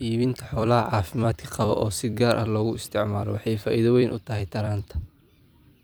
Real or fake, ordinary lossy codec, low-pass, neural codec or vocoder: fake; none; none; vocoder, 44.1 kHz, 128 mel bands every 256 samples, BigVGAN v2